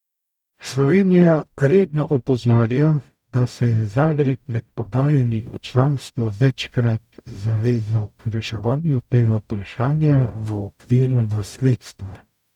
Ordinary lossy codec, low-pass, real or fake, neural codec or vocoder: none; 19.8 kHz; fake; codec, 44.1 kHz, 0.9 kbps, DAC